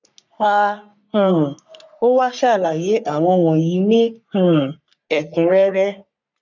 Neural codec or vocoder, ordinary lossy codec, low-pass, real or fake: codec, 44.1 kHz, 3.4 kbps, Pupu-Codec; none; 7.2 kHz; fake